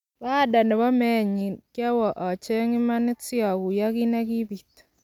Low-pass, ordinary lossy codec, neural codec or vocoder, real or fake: 19.8 kHz; none; none; real